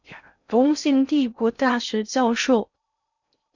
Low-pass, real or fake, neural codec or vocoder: 7.2 kHz; fake; codec, 16 kHz in and 24 kHz out, 0.6 kbps, FocalCodec, streaming, 2048 codes